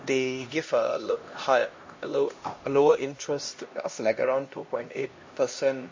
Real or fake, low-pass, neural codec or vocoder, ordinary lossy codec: fake; 7.2 kHz; codec, 16 kHz, 1 kbps, X-Codec, HuBERT features, trained on LibriSpeech; MP3, 32 kbps